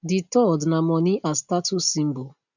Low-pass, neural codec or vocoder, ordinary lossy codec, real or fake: 7.2 kHz; none; none; real